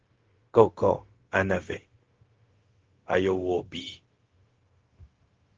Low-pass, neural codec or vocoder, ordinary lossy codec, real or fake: 7.2 kHz; codec, 16 kHz, 0.4 kbps, LongCat-Audio-Codec; Opus, 16 kbps; fake